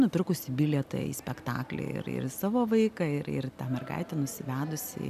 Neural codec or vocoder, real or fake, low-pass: none; real; 14.4 kHz